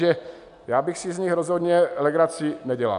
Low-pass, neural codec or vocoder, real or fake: 10.8 kHz; none; real